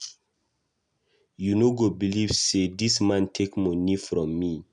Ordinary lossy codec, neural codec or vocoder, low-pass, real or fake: none; none; 10.8 kHz; real